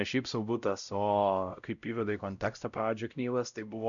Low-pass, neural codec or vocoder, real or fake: 7.2 kHz; codec, 16 kHz, 0.5 kbps, X-Codec, WavLM features, trained on Multilingual LibriSpeech; fake